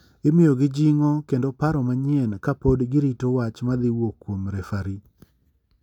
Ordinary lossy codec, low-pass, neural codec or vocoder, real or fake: none; 19.8 kHz; none; real